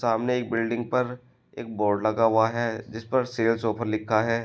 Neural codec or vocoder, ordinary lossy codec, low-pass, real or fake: none; none; none; real